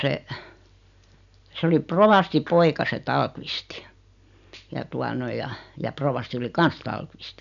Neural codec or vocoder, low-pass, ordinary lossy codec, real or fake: none; 7.2 kHz; none; real